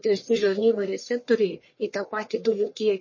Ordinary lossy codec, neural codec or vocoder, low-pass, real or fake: MP3, 32 kbps; codec, 44.1 kHz, 1.7 kbps, Pupu-Codec; 7.2 kHz; fake